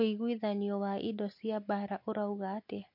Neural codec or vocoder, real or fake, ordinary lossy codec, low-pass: none; real; MP3, 32 kbps; 5.4 kHz